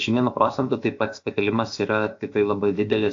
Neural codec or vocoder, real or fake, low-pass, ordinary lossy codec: codec, 16 kHz, 0.7 kbps, FocalCodec; fake; 7.2 kHz; AAC, 48 kbps